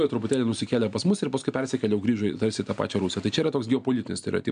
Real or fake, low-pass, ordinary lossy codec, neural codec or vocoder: real; 9.9 kHz; MP3, 96 kbps; none